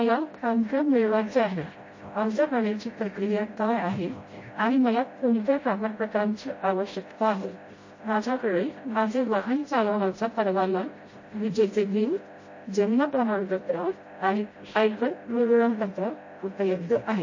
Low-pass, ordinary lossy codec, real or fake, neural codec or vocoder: 7.2 kHz; MP3, 32 kbps; fake; codec, 16 kHz, 0.5 kbps, FreqCodec, smaller model